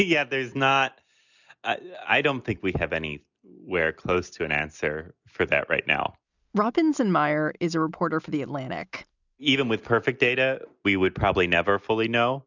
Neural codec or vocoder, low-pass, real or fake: none; 7.2 kHz; real